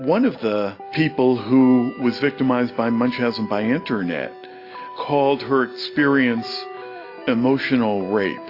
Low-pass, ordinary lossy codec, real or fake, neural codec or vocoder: 5.4 kHz; AAC, 32 kbps; real; none